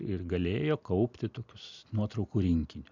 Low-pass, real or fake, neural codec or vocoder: 7.2 kHz; real; none